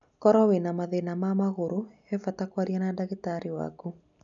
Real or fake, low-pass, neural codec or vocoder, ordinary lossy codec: real; 7.2 kHz; none; none